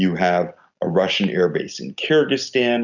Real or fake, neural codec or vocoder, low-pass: real; none; 7.2 kHz